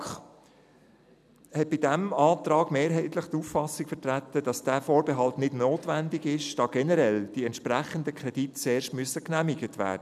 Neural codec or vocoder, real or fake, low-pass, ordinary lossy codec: none; real; 14.4 kHz; none